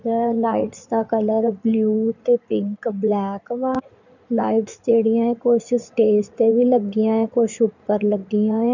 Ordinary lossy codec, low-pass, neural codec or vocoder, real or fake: none; 7.2 kHz; codec, 16 kHz in and 24 kHz out, 2.2 kbps, FireRedTTS-2 codec; fake